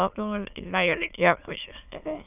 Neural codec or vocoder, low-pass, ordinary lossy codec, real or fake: autoencoder, 22.05 kHz, a latent of 192 numbers a frame, VITS, trained on many speakers; 3.6 kHz; none; fake